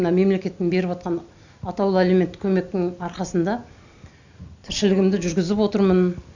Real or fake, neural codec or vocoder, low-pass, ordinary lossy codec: real; none; 7.2 kHz; none